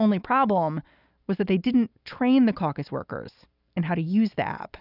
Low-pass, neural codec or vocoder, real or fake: 5.4 kHz; none; real